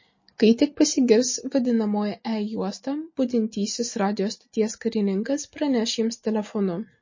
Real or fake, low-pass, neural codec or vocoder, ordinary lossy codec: real; 7.2 kHz; none; MP3, 32 kbps